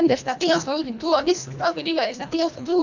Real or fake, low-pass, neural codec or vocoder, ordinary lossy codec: fake; 7.2 kHz; codec, 24 kHz, 1.5 kbps, HILCodec; none